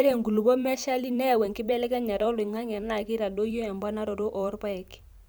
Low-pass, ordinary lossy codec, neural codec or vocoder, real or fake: none; none; vocoder, 44.1 kHz, 128 mel bands every 512 samples, BigVGAN v2; fake